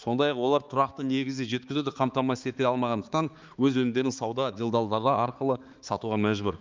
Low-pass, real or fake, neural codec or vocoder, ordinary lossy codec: 7.2 kHz; fake; codec, 16 kHz, 4 kbps, X-Codec, HuBERT features, trained on balanced general audio; Opus, 24 kbps